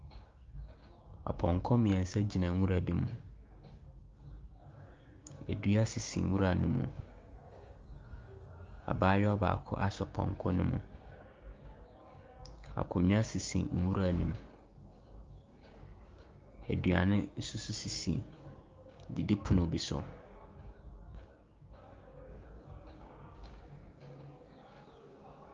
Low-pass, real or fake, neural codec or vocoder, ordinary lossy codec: 7.2 kHz; fake; codec, 16 kHz, 6 kbps, DAC; Opus, 32 kbps